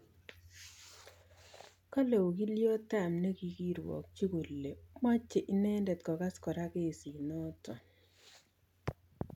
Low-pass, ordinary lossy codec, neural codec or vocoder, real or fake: 19.8 kHz; none; none; real